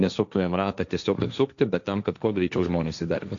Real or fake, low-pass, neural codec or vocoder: fake; 7.2 kHz; codec, 16 kHz, 1.1 kbps, Voila-Tokenizer